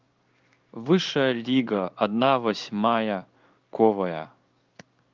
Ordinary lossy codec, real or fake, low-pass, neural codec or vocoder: Opus, 24 kbps; fake; 7.2 kHz; codec, 16 kHz in and 24 kHz out, 1 kbps, XY-Tokenizer